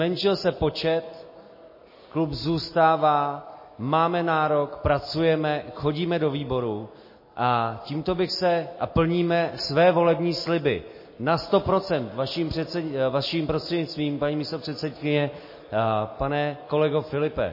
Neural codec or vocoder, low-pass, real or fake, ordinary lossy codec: none; 5.4 kHz; real; MP3, 24 kbps